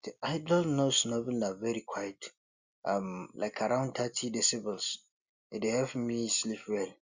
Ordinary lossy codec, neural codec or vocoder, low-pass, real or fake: Opus, 64 kbps; none; 7.2 kHz; real